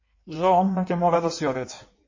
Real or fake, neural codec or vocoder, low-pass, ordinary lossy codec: fake; codec, 16 kHz in and 24 kHz out, 1.1 kbps, FireRedTTS-2 codec; 7.2 kHz; MP3, 32 kbps